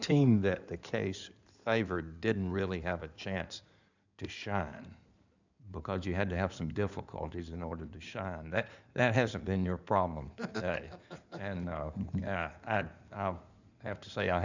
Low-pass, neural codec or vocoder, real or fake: 7.2 kHz; codec, 16 kHz in and 24 kHz out, 2.2 kbps, FireRedTTS-2 codec; fake